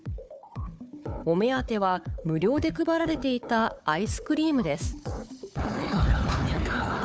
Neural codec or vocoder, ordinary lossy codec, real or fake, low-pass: codec, 16 kHz, 4 kbps, FunCodec, trained on Chinese and English, 50 frames a second; none; fake; none